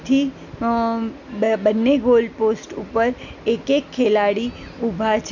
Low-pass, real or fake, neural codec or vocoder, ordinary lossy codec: 7.2 kHz; real; none; none